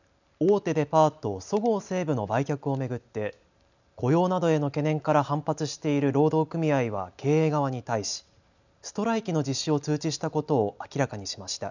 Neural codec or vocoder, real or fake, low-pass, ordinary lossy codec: none; real; 7.2 kHz; none